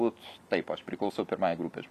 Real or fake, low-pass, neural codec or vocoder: real; 14.4 kHz; none